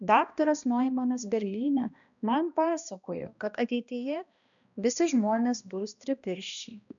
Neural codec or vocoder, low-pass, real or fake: codec, 16 kHz, 1 kbps, X-Codec, HuBERT features, trained on balanced general audio; 7.2 kHz; fake